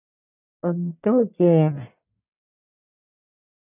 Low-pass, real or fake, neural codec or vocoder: 3.6 kHz; fake; codec, 44.1 kHz, 1.7 kbps, Pupu-Codec